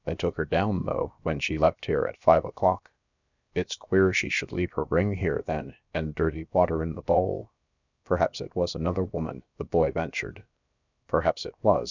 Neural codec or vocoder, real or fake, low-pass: codec, 16 kHz, about 1 kbps, DyCAST, with the encoder's durations; fake; 7.2 kHz